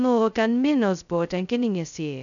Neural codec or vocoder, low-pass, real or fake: codec, 16 kHz, 0.2 kbps, FocalCodec; 7.2 kHz; fake